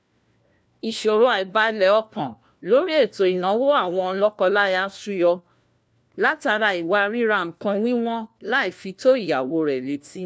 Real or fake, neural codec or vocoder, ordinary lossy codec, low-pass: fake; codec, 16 kHz, 1 kbps, FunCodec, trained on LibriTTS, 50 frames a second; none; none